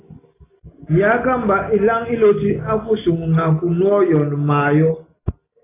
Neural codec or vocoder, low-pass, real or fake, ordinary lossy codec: none; 3.6 kHz; real; AAC, 16 kbps